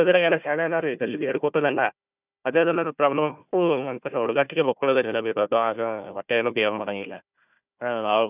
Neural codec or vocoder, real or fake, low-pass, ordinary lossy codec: codec, 16 kHz, 1 kbps, FunCodec, trained on Chinese and English, 50 frames a second; fake; 3.6 kHz; none